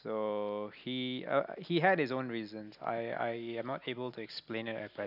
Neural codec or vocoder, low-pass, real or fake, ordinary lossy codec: none; 5.4 kHz; real; none